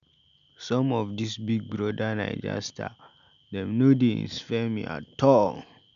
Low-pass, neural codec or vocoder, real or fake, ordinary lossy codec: 7.2 kHz; none; real; none